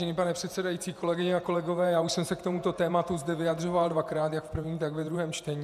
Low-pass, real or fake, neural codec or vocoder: 14.4 kHz; fake; vocoder, 48 kHz, 128 mel bands, Vocos